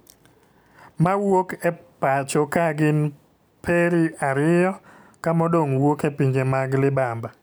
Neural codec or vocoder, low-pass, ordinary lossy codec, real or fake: none; none; none; real